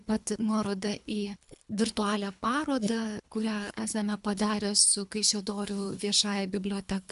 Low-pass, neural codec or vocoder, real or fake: 10.8 kHz; codec, 24 kHz, 3 kbps, HILCodec; fake